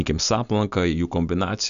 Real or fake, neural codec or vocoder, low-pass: real; none; 7.2 kHz